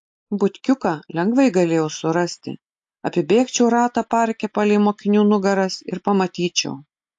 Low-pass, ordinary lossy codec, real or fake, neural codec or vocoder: 10.8 kHz; AAC, 64 kbps; real; none